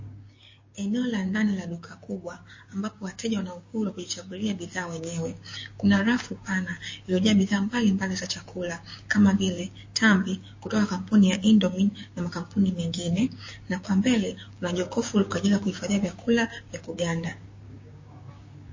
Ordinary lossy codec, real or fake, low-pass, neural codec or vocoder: MP3, 32 kbps; fake; 7.2 kHz; codec, 16 kHz, 6 kbps, DAC